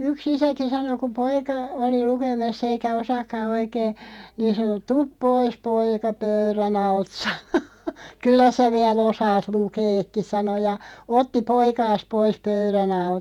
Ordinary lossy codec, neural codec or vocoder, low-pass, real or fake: none; vocoder, 48 kHz, 128 mel bands, Vocos; 19.8 kHz; fake